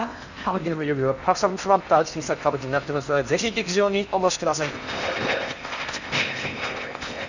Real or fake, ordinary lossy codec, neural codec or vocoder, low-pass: fake; none; codec, 16 kHz in and 24 kHz out, 0.8 kbps, FocalCodec, streaming, 65536 codes; 7.2 kHz